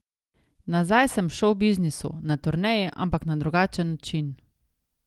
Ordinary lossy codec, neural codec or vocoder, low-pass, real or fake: Opus, 24 kbps; none; 19.8 kHz; real